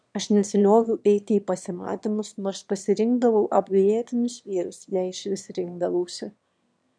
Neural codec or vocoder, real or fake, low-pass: autoencoder, 22.05 kHz, a latent of 192 numbers a frame, VITS, trained on one speaker; fake; 9.9 kHz